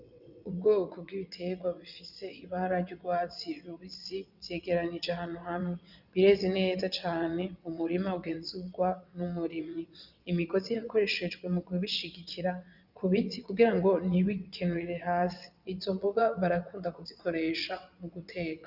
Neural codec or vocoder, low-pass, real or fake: vocoder, 22.05 kHz, 80 mel bands, WaveNeXt; 5.4 kHz; fake